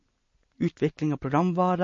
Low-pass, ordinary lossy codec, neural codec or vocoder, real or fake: 7.2 kHz; MP3, 32 kbps; none; real